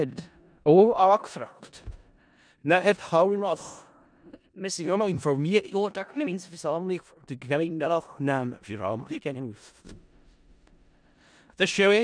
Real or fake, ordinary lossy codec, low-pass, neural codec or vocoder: fake; none; 9.9 kHz; codec, 16 kHz in and 24 kHz out, 0.4 kbps, LongCat-Audio-Codec, four codebook decoder